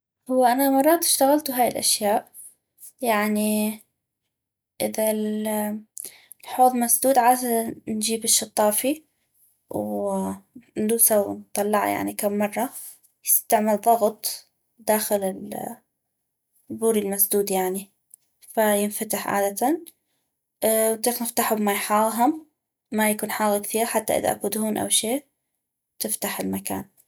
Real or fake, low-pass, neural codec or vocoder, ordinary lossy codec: real; none; none; none